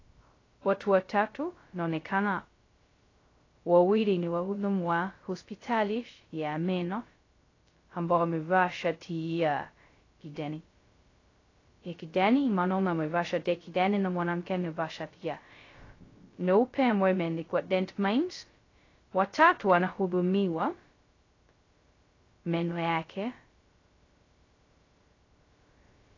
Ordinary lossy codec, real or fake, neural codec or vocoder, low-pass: AAC, 32 kbps; fake; codec, 16 kHz, 0.2 kbps, FocalCodec; 7.2 kHz